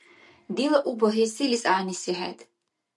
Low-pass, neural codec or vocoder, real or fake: 10.8 kHz; none; real